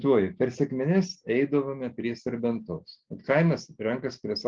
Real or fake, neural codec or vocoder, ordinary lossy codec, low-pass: real; none; Opus, 16 kbps; 7.2 kHz